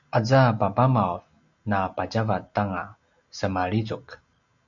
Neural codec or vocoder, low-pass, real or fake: none; 7.2 kHz; real